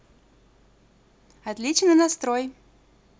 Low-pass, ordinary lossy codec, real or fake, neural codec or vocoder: none; none; real; none